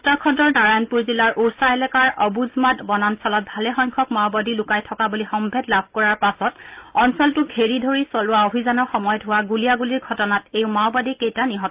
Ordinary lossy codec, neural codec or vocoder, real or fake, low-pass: Opus, 32 kbps; none; real; 3.6 kHz